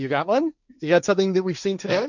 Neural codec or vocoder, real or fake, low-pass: codec, 16 kHz, 1.1 kbps, Voila-Tokenizer; fake; 7.2 kHz